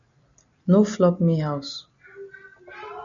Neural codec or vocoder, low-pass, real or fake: none; 7.2 kHz; real